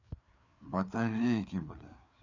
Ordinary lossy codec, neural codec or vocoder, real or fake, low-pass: none; codec, 16 kHz, 4 kbps, FreqCodec, larger model; fake; 7.2 kHz